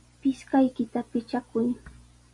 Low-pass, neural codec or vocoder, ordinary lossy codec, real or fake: 10.8 kHz; none; AAC, 64 kbps; real